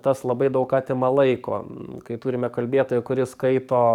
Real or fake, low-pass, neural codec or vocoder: fake; 19.8 kHz; codec, 44.1 kHz, 7.8 kbps, DAC